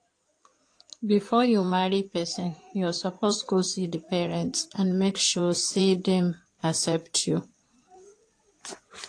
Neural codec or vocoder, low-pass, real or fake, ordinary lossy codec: codec, 16 kHz in and 24 kHz out, 2.2 kbps, FireRedTTS-2 codec; 9.9 kHz; fake; AAC, 48 kbps